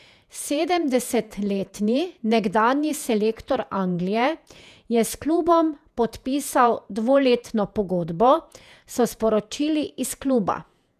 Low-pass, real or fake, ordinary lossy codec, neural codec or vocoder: 14.4 kHz; fake; none; vocoder, 48 kHz, 128 mel bands, Vocos